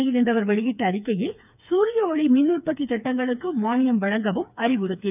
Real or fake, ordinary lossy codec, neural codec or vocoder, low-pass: fake; none; codec, 16 kHz, 4 kbps, FreqCodec, smaller model; 3.6 kHz